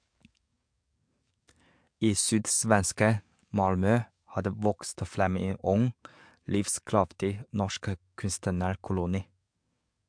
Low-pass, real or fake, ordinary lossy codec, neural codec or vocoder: 9.9 kHz; fake; MP3, 64 kbps; codec, 44.1 kHz, 7.8 kbps, DAC